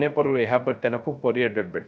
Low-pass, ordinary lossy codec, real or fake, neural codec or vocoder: none; none; fake; codec, 16 kHz, 0.3 kbps, FocalCodec